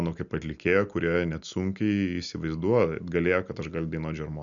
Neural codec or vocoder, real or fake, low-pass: none; real; 7.2 kHz